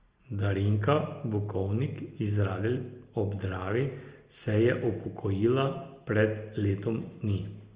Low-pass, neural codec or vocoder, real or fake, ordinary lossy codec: 3.6 kHz; none; real; Opus, 32 kbps